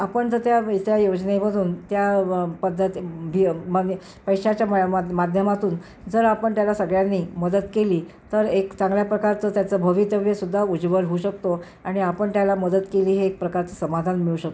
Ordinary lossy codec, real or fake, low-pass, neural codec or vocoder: none; real; none; none